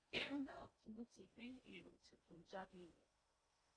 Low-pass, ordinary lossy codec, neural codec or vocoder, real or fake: 9.9 kHz; AAC, 32 kbps; codec, 16 kHz in and 24 kHz out, 0.6 kbps, FocalCodec, streaming, 4096 codes; fake